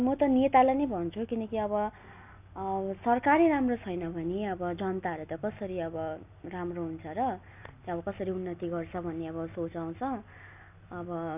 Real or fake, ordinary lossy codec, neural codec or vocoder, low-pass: real; none; none; 3.6 kHz